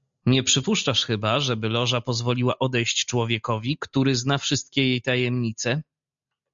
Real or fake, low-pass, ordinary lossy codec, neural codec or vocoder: real; 7.2 kHz; MP3, 64 kbps; none